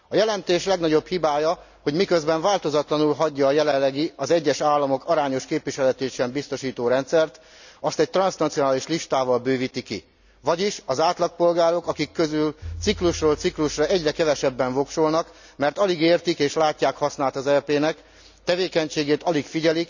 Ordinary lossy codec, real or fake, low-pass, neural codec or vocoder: none; real; 7.2 kHz; none